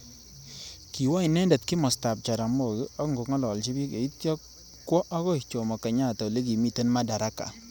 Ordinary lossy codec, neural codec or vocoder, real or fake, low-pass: none; none; real; none